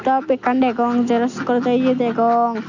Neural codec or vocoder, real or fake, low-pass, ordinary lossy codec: none; real; 7.2 kHz; none